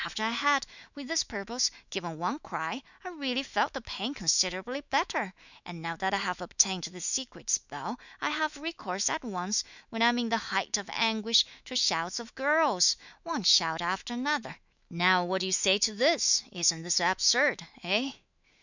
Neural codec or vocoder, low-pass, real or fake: autoencoder, 48 kHz, 128 numbers a frame, DAC-VAE, trained on Japanese speech; 7.2 kHz; fake